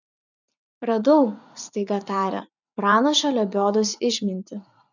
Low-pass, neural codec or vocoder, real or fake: 7.2 kHz; vocoder, 44.1 kHz, 80 mel bands, Vocos; fake